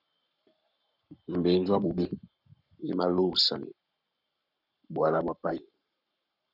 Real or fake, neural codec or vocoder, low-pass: fake; codec, 44.1 kHz, 7.8 kbps, Pupu-Codec; 5.4 kHz